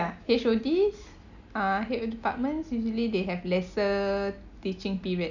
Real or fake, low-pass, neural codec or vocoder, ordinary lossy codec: real; 7.2 kHz; none; none